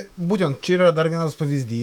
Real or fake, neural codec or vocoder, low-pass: fake; autoencoder, 48 kHz, 128 numbers a frame, DAC-VAE, trained on Japanese speech; 19.8 kHz